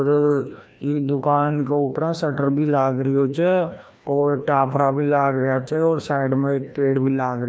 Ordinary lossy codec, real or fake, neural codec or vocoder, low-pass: none; fake; codec, 16 kHz, 1 kbps, FreqCodec, larger model; none